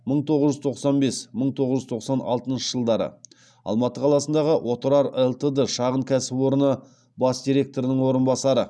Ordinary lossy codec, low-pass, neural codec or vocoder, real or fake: none; none; none; real